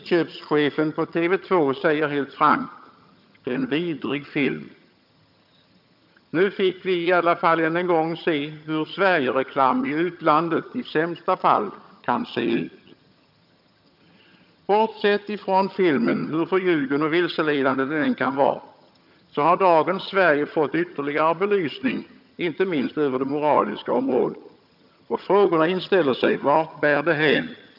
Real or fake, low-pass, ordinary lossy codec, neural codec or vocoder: fake; 5.4 kHz; none; vocoder, 22.05 kHz, 80 mel bands, HiFi-GAN